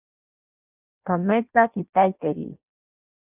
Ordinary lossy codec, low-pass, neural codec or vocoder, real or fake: AAC, 32 kbps; 3.6 kHz; codec, 16 kHz in and 24 kHz out, 0.6 kbps, FireRedTTS-2 codec; fake